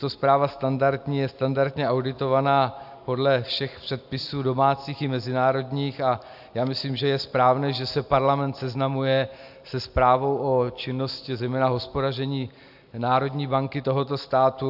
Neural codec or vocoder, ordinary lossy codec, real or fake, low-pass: none; AAC, 48 kbps; real; 5.4 kHz